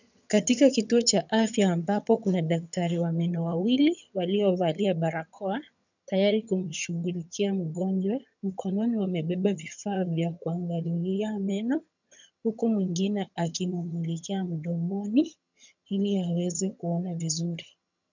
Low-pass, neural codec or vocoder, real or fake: 7.2 kHz; vocoder, 22.05 kHz, 80 mel bands, HiFi-GAN; fake